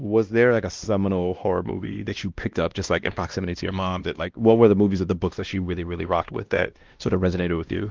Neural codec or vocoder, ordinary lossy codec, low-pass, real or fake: codec, 16 kHz, 1 kbps, X-Codec, WavLM features, trained on Multilingual LibriSpeech; Opus, 32 kbps; 7.2 kHz; fake